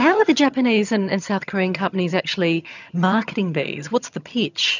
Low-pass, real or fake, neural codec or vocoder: 7.2 kHz; fake; vocoder, 22.05 kHz, 80 mel bands, HiFi-GAN